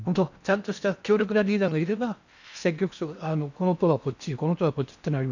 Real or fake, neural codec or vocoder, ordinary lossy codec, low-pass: fake; codec, 16 kHz in and 24 kHz out, 0.8 kbps, FocalCodec, streaming, 65536 codes; none; 7.2 kHz